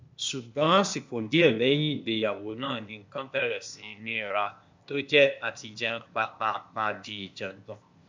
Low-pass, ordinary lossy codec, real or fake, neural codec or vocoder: 7.2 kHz; MP3, 64 kbps; fake; codec, 16 kHz, 0.8 kbps, ZipCodec